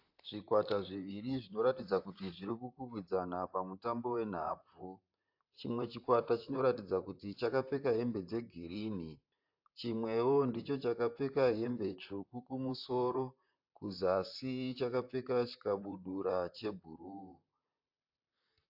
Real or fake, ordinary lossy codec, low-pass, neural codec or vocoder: fake; AAC, 48 kbps; 5.4 kHz; vocoder, 44.1 kHz, 128 mel bands, Pupu-Vocoder